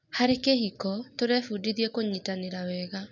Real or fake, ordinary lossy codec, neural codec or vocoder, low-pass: real; none; none; 7.2 kHz